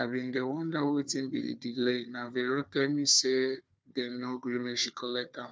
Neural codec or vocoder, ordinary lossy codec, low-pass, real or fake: codec, 16 kHz, 4 kbps, FunCodec, trained on Chinese and English, 50 frames a second; none; none; fake